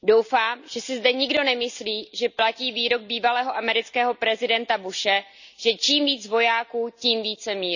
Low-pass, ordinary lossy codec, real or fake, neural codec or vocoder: 7.2 kHz; none; real; none